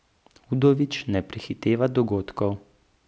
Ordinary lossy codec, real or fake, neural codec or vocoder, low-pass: none; real; none; none